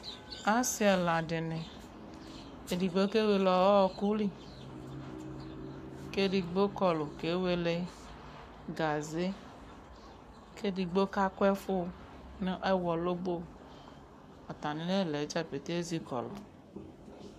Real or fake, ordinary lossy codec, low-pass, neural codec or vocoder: fake; AAC, 96 kbps; 14.4 kHz; codec, 44.1 kHz, 7.8 kbps, Pupu-Codec